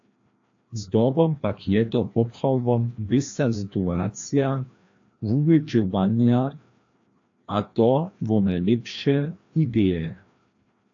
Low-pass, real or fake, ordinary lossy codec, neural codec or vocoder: 7.2 kHz; fake; AAC, 48 kbps; codec, 16 kHz, 1 kbps, FreqCodec, larger model